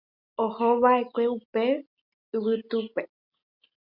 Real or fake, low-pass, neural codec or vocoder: real; 5.4 kHz; none